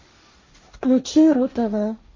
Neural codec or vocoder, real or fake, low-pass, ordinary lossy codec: codec, 16 kHz, 1.1 kbps, Voila-Tokenizer; fake; 7.2 kHz; MP3, 32 kbps